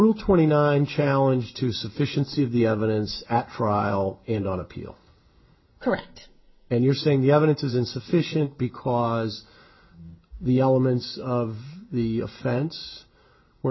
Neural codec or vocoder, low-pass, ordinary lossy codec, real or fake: none; 7.2 kHz; MP3, 24 kbps; real